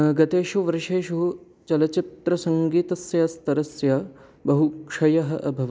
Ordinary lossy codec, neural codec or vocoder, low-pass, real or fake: none; none; none; real